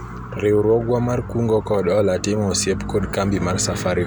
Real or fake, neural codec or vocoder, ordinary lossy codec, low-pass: real; none; none; 19.8 kHz